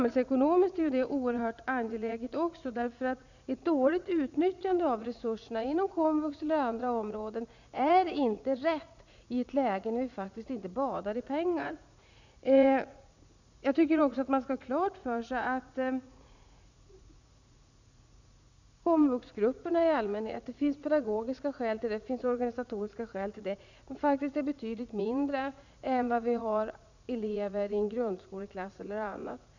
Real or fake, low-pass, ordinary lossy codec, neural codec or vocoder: fake; 7.2 kHz; none; vocoder, 44.1 kHz, 80 mel bands, Vocos